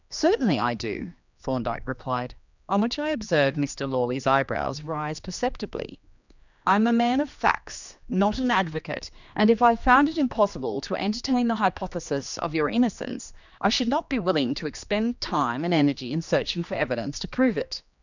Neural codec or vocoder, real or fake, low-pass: codec, 16 kHz, 2 kbps, X-Codec, HuBERT features, trained on general audio; fake; 7.2 kHz